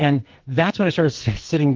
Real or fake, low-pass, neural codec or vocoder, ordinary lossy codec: fake; 7.2 kHz; codec, 44.1 kHz, 2.6 kbps, SNAC; Opus, 16 kbps